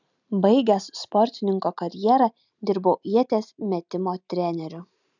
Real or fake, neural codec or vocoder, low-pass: real; none; 7.2 kHz